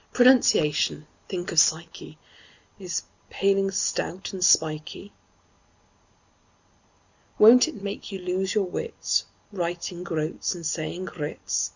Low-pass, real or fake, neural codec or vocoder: 7.2 kHz; real; none